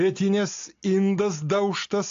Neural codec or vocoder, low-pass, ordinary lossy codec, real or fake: none; 7.2 kHz; AAC, 64 kbps; real